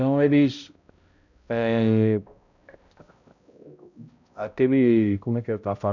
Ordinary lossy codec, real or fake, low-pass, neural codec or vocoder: none; fake; 7.2 kHz; codec, 16 kHz, 0.5 kbps, X-Codec, HuBERT features, trained on balanced general audio